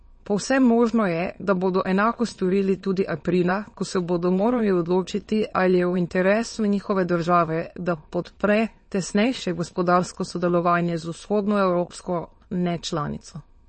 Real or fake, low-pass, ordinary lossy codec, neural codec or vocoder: fake; 9.9 kHz; MP3, 32 kbps; autoencoder, 22.05 kHz, a latent of 192 numbers a frame, VITS, trained on many speakers